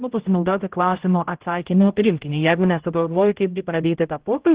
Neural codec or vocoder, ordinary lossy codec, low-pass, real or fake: codec, 16 kHz, 0.5 kbps, X-Codec, HuBERT features, trained on general audio; Opus, 16 kbps; 3.6 kHz; fake